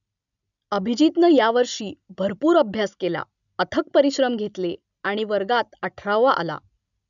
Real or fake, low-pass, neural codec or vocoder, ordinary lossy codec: real; 7.2 kHz; none; none